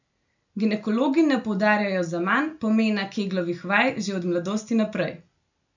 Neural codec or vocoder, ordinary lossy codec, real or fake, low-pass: none; none; real; 7.2 kHz